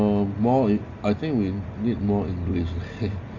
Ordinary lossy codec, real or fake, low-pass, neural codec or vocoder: none; real; 7.2 kHz; none